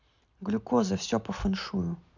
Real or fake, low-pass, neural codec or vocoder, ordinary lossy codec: real; 7.2 kHz; none; none